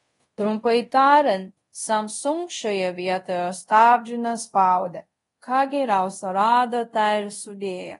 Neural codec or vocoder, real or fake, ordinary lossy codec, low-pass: codec, 24 kHz, 0.5 kbps, DualCodec; fake; AAC, 32 kbps; 10.8 kHz